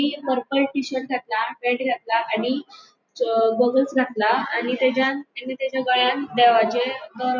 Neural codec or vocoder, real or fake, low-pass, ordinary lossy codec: none; real; 7.2 kHz; none